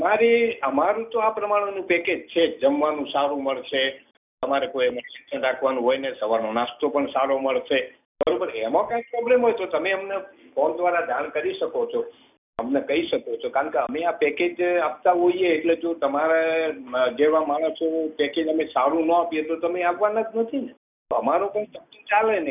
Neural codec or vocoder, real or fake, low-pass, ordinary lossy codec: none; real; 3.6 kHz; none